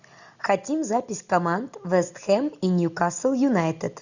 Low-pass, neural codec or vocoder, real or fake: 7.2 kHz; none; real